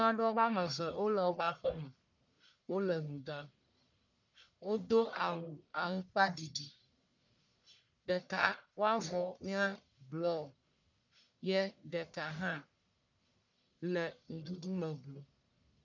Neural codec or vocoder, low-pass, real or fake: codec, 44.1 kHz, 1.7 kbps, Pupu-Codec; 7.2 kHz; fake